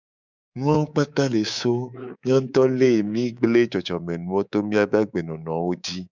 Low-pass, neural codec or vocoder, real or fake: 7.2 kHz; codec, 24 kHz, 3.1 kbps, DualCodec; fake